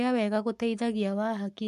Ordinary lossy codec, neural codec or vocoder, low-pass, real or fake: MP3, 64 kbps; codec, 44.1 kHz, 7.8 kbps, Pupu-Codec; 14.4 kHz; fake